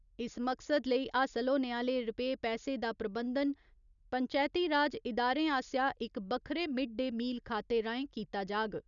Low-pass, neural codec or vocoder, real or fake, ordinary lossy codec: 7.2 kHz; none; real; none